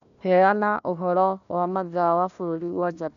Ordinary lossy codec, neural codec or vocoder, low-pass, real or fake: none; codec, 16 kHz, 1 kbps, FunCodec, trained on Chinese and English, 50 frames a second; 7.2 kHz; fake